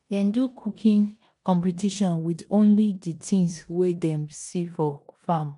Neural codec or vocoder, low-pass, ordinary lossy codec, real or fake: codec, 16 kHz in and 24 kHz out, 0.9 kbps, LongCat-Audio-Codec, four codebook decoder; 10.8 kHz; none; fake